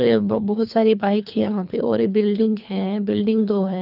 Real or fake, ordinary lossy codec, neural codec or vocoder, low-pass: fake; none; codec, 24 kHz, 3 kbps, HILCodec; 5.4 kHz